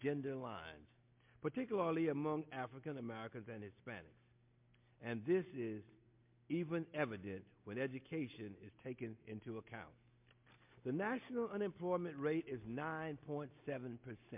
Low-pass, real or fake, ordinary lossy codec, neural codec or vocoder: 3.6 kHz; real; MP3, 32 kbps; none